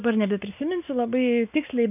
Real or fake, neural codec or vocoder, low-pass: real; none; 3.6 kHz